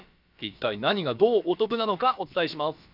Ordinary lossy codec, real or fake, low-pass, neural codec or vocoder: none; fake; 5.4 kHz; codec, 16 kHz, about 1 kbps, DyCAST, with the encoder's durations